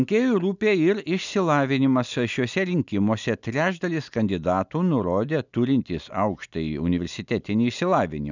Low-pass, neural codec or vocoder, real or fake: 7.2 kHz; none; real